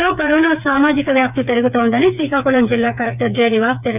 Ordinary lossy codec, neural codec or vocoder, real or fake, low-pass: none; codec, 16 kHz, 4 kbps, FreqCodec, smaller model; fake; 3.6 kHz